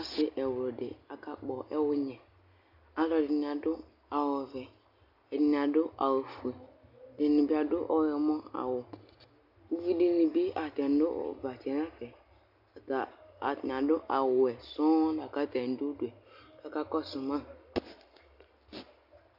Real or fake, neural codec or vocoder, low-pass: real; none; 5.4 kHz